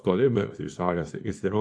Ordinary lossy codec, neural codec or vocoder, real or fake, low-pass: AAC, 64 kbps; codec, 24 kHz, 0.9 kbps, WavTokenizer, small release; fake; 9.9 kHz